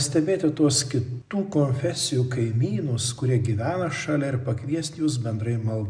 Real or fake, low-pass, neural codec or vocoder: real; 9.9 kHz; none